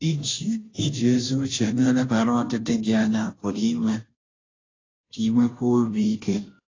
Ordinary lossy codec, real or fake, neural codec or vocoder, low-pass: AAC, 32 kbps; fake; codec, 16 kHz, 0.5 kbps, FunCodec, trained on Chinese and English, 25 frames a second; 7.2 kHz